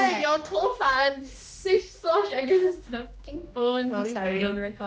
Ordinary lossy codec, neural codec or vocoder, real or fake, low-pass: none; codec, 16 kHz, 1 kbps, X-Codec, HuBERT features, trained on general audio; fake; none